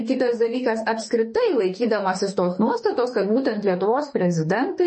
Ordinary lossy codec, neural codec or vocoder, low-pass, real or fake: MP3, 32 kbps; autoencoder, 48 kHz, 32 numbers a frame, DAC-VAE, trained on Japanese speech; 10.8 kHz; fake